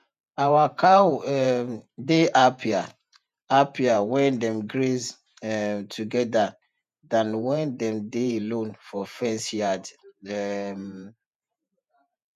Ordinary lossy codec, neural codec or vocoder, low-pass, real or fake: none; vocoder, 48 kHz, 128 mel bands, Vocos; 14.4 kHz; fake